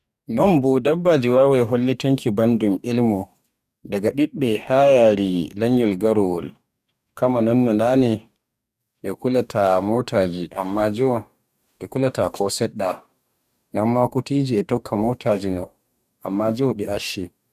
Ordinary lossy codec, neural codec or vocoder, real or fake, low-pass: none; codec, 44.1 kHz, 2.6 kbps, DAC; fake; 14.4 kHz